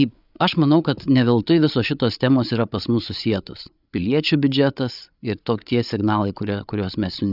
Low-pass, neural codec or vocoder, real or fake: 5.4 kHz; codec, 16 kHz, 8 kbps, FreqCodec, larger model; fake